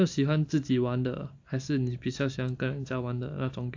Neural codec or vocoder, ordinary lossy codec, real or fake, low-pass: none; AAC, 48 kbps; real; 7.2 kHz